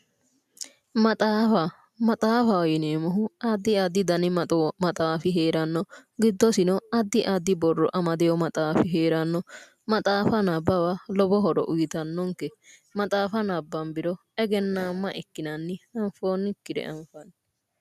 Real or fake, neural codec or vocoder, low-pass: real; none; 14.4 kHz